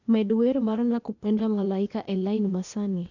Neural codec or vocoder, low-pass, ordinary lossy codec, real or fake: codec, 16 kHz, 0.8 kbps, ZipCodec; 7.2 kHz; none; fake